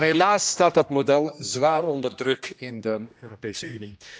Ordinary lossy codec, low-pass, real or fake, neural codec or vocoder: none; none; fake; codec, 16 kHz, 1 kbps, X-Codec, HuBERT features, trained on general audio